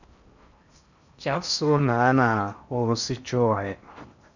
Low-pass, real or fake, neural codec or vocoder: 7.2 kHz; fake; codec, 16 kHz in and 24 kHz out, 0.6 kbps, FocalCodec, streaming, 4096 codes